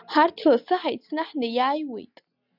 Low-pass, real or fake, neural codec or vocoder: 5.4 kHz; real; none